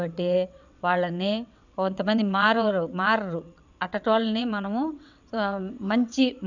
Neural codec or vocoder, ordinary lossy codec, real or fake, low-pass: vocoder, 22.05 kHz, 80 mel bands, WaveNeXt; none; fake; 7.2 kHz